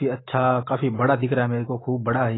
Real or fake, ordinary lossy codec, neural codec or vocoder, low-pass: real; AAC, 16 kbps; none; 7.2 kHz